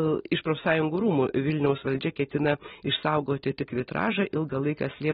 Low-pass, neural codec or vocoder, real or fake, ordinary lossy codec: 19.8 kHz; none; real; AAC, 16 kbps